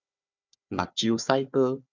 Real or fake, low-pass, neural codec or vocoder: fake; 7.2 kHz; codec, 16 kHz, 4 kbps, FunCodec, trained on Chinese and English, 50 frames a second